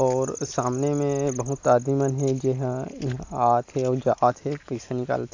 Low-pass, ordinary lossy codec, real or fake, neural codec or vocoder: 7.2 kHz; AAC, 48 kbps; real; none